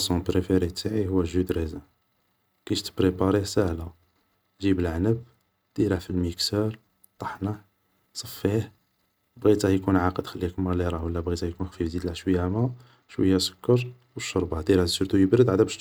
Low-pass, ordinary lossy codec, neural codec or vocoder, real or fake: none; none; none; real